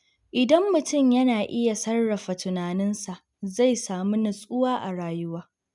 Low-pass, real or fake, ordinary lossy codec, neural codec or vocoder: 10.8 kHz; real; none; none